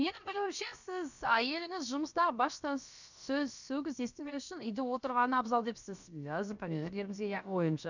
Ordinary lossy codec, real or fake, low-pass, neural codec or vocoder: none; fake; 7.2 kHz; codec, 16 kHz, about 1 kbps, DyCAST, with the encoder's durations